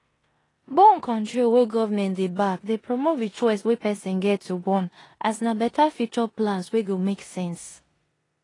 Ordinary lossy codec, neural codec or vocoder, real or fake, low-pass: AAC, 32 kbps; codec, 16 kHz in and 24 kHz out, 0.9 kbps, LongCat-Audio-Codec, four codebook decoder; fake; 10.8 kHz